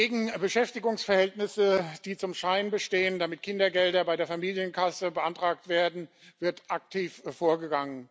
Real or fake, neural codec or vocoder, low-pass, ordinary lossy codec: real; none; none; none